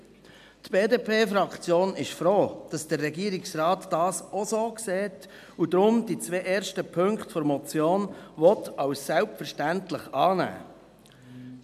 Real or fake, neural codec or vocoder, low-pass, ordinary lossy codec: real; none; 14.4 kHz; MP3, 96 kbps